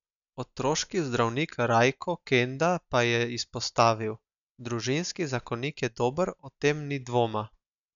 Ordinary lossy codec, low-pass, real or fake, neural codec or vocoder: none; 7.2 kHz; real; none